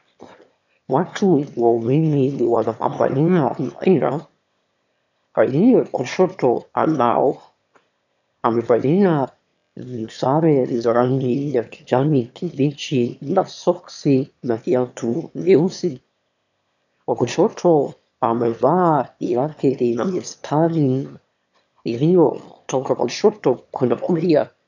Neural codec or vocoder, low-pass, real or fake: autoencoder, 22.05 kHz, a latent of 192 numbers a frame, VITS, trained on one speaker; 7.2 kHz; fake